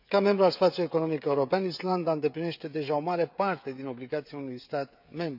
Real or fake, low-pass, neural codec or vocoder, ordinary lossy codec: fake; 5.4 kHz; codec, 16 kHz, 16 kbps, FreqCodec, smaller model; none